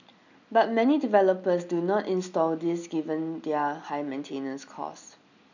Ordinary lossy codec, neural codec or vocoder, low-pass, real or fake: none; none; 7.2 kHz; real